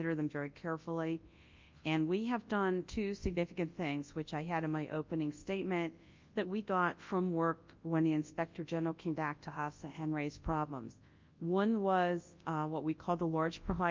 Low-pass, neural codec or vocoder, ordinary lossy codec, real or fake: 7.2 kHz; codec, 24 kHz, 0.9 kbps, WavTokenizer, large speech release; Opus, 24 kbps; fake